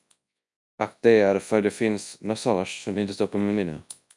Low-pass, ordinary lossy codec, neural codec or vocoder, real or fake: 10.8 kHz; MP3, 96 kbps; codec, 24 kHz, 0.9 kbps, WavTokenizer, large speech release; fake